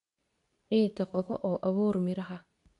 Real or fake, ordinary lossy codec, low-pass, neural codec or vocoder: fake; none; 10.8 kHz; codec, 24 kHz, 0.9 kbps, WavTokenizer, medium speech release version 2